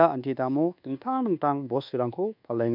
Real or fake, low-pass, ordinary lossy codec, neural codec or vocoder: fake; 5.4 kHz; none; codec, 24 kHz, 1.2 kbps, DualCodec